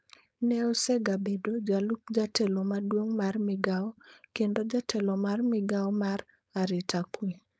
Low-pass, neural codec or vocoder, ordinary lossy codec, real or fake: none; codec, 16 kHz, 4.8 kbps, FACodec; none; fake